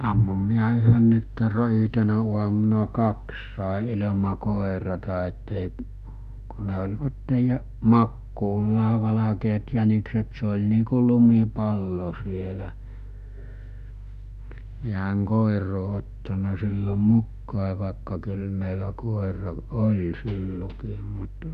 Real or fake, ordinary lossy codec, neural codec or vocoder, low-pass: fake; none; autoencoder, 48 kHz, 32 numbers a frame, DAC-VAE, trained on Japanese speech; 14.4 kHz